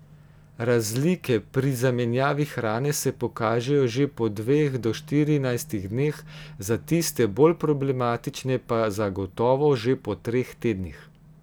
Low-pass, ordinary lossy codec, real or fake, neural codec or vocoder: none; none; real; none